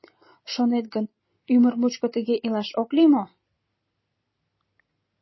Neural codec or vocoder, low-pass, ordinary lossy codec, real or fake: none; 7.2 kHz; MP3, 24 kbps; real